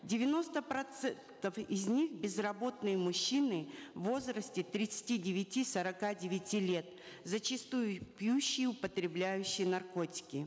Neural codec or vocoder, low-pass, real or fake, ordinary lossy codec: none; none; real; none